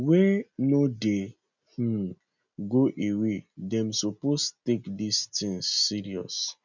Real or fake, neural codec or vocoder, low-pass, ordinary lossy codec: real; none; 7.2 kHz; none